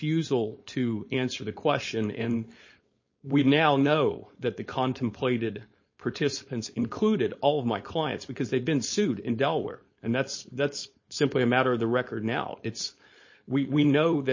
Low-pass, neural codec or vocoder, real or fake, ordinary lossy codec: 7.2 kHz; codec, 16 kHz, 4.8 kbps, FACodec; fake; MP3, 32 kbps